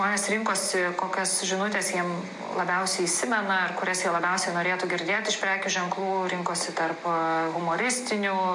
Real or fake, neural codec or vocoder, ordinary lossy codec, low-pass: real; none; MP3, 64 kbps; 10.8 kHz